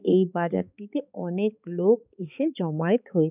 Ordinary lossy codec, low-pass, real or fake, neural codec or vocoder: none; 3.6 kHz; fake; codec, 16 kHz, 4 kbps, X-Codec, HuBERT features, trained on balanced general audio